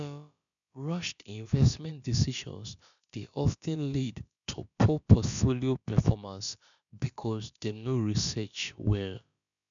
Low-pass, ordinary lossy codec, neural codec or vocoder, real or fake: 7.2 kHz; MP3, 96 kbps; codec, 16 kHz, about 1 kbps, DyCAST, with the encoder's durations; fake